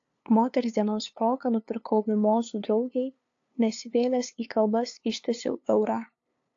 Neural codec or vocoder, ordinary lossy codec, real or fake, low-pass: codec, 16 kHz, 2 kbps, FunCodec, trained on LibriTTS, 25 frames a second; AAC, 48 kbps; fake; 7.2 kHz